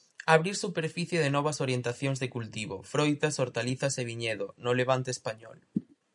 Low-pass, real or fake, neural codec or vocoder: 10.8 kHz; real; none